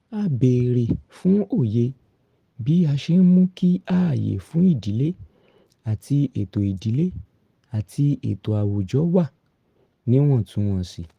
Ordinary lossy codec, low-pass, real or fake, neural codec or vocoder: Opus, 24 kbps; 14.4 kHz; real; none